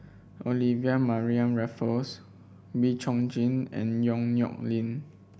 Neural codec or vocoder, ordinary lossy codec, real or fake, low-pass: none; none; real; none